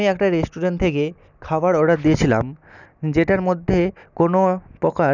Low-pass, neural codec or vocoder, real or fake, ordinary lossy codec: 7.2 kHz; none; real; none